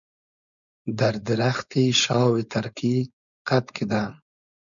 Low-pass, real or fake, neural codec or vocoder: 7.2 kHz; fake; codec, 16 kHz, 4.8 kbps, FACodec